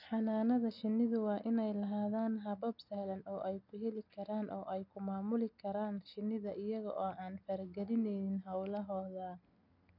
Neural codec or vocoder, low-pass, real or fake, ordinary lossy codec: none; 5.4 kHz; real; none